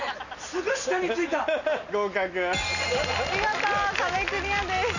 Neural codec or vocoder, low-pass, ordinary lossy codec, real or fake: none; 7.2 kHz; none; real